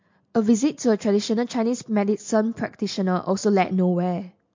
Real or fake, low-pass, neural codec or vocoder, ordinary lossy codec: real; 7.2 kHz; none; MP3, 48 kbps